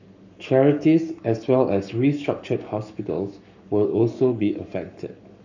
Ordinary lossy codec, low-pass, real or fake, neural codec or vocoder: none; 7.2 kHz; fake; codec, 44.1 kHz, 7.8 kbps, Pupu-Codec